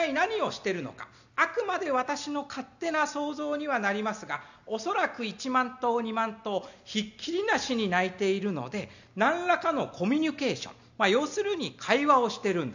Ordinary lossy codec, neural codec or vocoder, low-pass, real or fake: none; none; 7.2 kHz; real